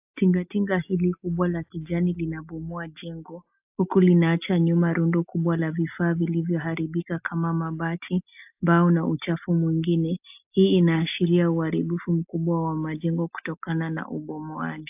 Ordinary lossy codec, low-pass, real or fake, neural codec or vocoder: AAC, 32 kbps; 3.6 kHz; real; none